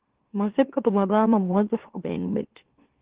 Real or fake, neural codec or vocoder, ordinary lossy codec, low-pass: fake; autoencoder, 44.1 kHz, a latent of 192 numbers a frame, MeloTTS; Opus, 16 kbps; 3.6 kHz